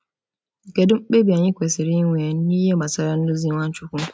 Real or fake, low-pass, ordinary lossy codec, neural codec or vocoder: real; none; none; none